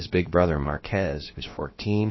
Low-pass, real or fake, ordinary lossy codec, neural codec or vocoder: 7.2 kHz; fake; MP3, 24 kbps; codec, 16 kHz, about 1 kbps, DyCAST, with the encoder's durations